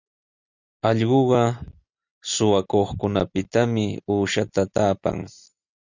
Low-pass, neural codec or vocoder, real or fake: 7.2 kHz; none; real